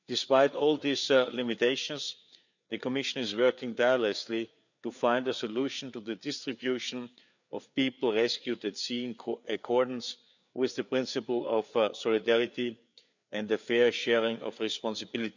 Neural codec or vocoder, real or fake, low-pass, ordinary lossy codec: codec, 16 kHz, 4 kbps, FreqCodec, larger model; fake; 7.2 kHz; none